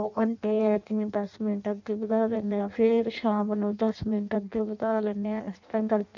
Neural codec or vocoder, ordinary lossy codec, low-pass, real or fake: codec, 16 kHz in and 24 kHz out, 0.6 kbps, FireRedTTS-2 codec; none; 7.2 kHz; fake